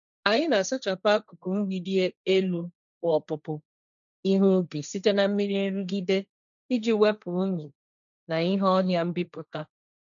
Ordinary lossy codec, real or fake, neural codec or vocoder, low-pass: none; fake; codec, 16 kHz, 1.1 kbps, Voila-Tokenizer; 7.2 kHz